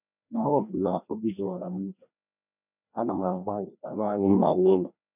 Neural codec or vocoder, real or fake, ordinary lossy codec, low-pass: codec, 16 kHz, 1 kbps, FreqCodec, larger model; fake; none; 3.6 kHz